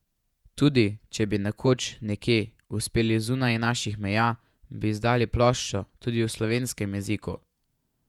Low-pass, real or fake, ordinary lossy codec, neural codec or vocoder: 19.8 kHz; fake; none; vocoder, 48 kHz, 128 mel bands, Vocos